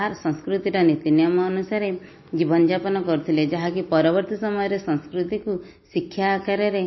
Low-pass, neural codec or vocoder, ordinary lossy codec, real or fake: 7.2 kHz; none; MP3, 24 kbps; real